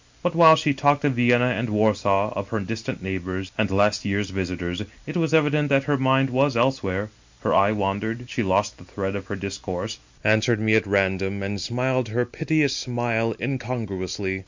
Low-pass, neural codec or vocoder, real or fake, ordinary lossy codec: 7.2 kHz; none; real; MP3, 64 kbps